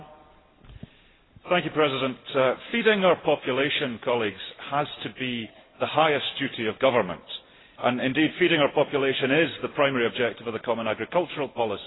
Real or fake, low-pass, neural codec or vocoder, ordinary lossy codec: real; 7.2 kHz; none; AAC, 16 kbps